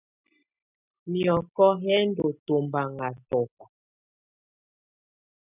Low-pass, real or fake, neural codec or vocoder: 3.6 kHz; real; none